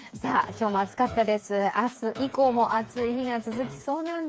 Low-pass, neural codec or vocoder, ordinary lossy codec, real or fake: none; codec, 16 kHz, 4 kbps, FreqCodec, smaller model; none; fake